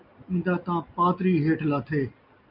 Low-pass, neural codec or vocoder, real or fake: 5.4 kHz; none; real